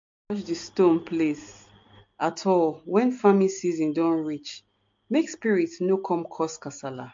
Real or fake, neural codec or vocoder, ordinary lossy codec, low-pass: real; none; MP3, 48 kbps; 7.2 kHz